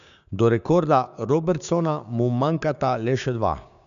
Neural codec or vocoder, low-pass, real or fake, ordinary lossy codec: codec, 16 kHz, 6 kbps, DAC; 7.2 kHz; fake; none